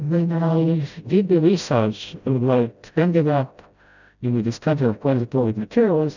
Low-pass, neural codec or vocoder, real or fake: 7.2 kHz; codec, 16 kHz, 0.5 kbps, FreqCodec, smaller model; fake